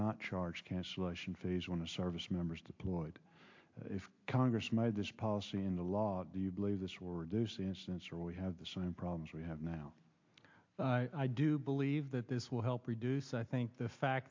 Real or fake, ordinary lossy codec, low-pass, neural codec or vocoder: real; MP3, 48 kbps; 7.2 kHz; none